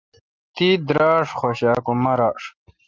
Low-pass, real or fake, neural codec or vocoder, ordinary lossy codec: 7.2 kHz; real; none; Opus, 24 kbps